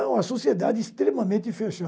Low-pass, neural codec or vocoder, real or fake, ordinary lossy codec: none; none; real; none